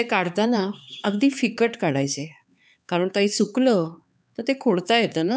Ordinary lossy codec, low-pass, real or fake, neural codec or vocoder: none; none; fake; codec, 16 kHz, 4 kbps, X-Codec, HuBERT features, trained on LibriSpeech